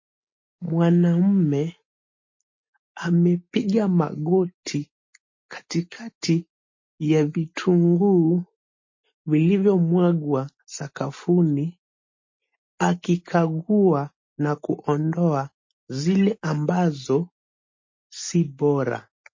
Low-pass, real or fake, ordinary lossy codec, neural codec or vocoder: 7.2 kHz; real; MP3, 32 kbps; none